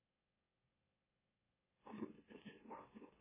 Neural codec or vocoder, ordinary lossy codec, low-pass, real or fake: autoencoder, 44.1 kHz, a latent of 192 numbers a frame, MeloTTS; MP3, 16 kbps; 3.6 kHz; fake